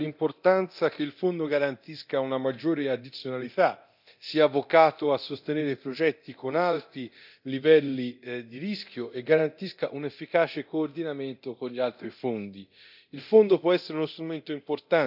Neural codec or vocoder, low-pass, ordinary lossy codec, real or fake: codec, 24 kHz, 0.9 kbps, DualCodec; 5.4 kHz; none; fake